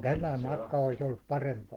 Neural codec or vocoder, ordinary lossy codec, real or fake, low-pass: codec, 44.1 kHz, 7.8 kbps, Pupu-Codec; Opus, 16 kbps; fake; 19.8 kHz